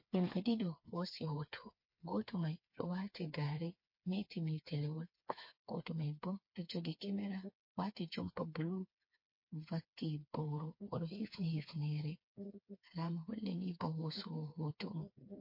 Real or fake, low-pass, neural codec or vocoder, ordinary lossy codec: fake; 5.4 kHz; codec, 16 kHz, 4 kbps, FreqCodec, smaller model; MP3, 32 kbps